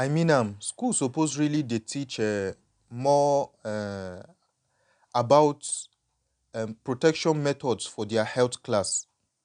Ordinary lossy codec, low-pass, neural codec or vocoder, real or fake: MP3, 96 kbps; 9.9 kHz; none; real